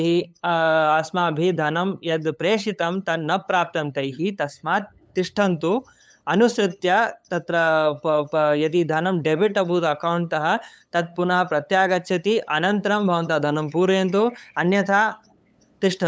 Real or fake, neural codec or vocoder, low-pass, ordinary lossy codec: fake; codec, 16 kHz, 8 kbps, FunCodec, trained on LibriTTS, 25 frames a second; none; none